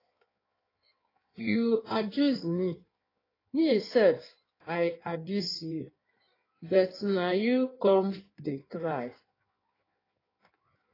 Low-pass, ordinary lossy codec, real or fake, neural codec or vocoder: 5.4 kHz; AAC, 24 kbps; fake; codec, 16 kHz in and 24 kHz out, 1.1 kbps, FireRedTTS-2 codec